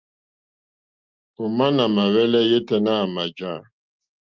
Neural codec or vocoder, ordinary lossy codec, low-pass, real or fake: none; Opus, 32 kbps; 7.2 kHz; real